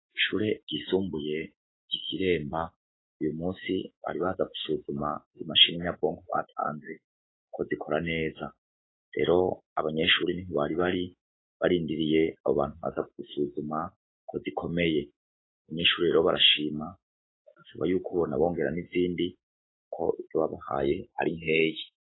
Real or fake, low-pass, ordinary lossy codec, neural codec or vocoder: fake; 7.2 kHz; AAC, 16 kbps; autoencoder, 48 kHz, 128 numbers a frame, DAC-VAE, trained on Japanese speech